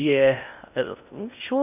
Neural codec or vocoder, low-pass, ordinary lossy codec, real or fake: codec, 16 kHz in and 24 kHz out, 0.6 kbps, FocalCodec, streaming, 4096 codes; 3.6 kHz; none; fake